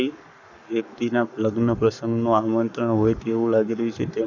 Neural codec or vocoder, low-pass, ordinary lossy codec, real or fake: codec, 44.1 kHz, 7.8 kbps, Pupu-Codec; 7.2 kHz; none; fake